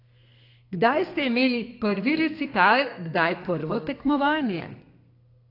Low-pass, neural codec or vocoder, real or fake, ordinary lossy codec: 5.4 kHz; codec, 32 kHz, 1.9 kbps, SNAC; fake; AAC, 32 kbps